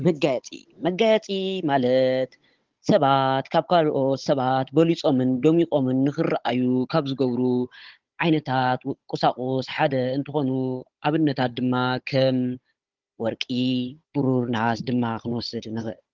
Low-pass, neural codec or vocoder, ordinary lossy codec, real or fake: 7.2 kHz; codec, 16 kHz, 16 kbps, FunCodec, trained on Chinese and English, 50 frames a second; Opus, 16 kbps; fake